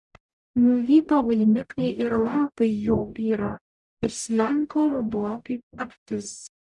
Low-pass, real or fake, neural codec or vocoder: 10.8 kHz; fake; codec, 44.1 kHz, 0.9 kbps, DAC